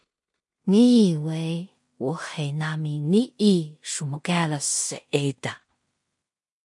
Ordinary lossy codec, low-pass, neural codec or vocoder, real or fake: MP3, 48 kbps; 10.8 kHz; codec, 16 kHz in and 24 kHz out, 0.4 kbps, LongCat-Audio-Codec, two codebook decoder; fake